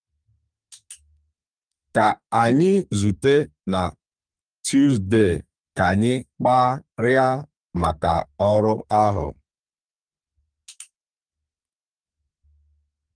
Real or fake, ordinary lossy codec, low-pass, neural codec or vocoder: fake; Opus, 32 kbps; 9.9 kHz; codec, 32 kHz, 1.9 kbps, SNAC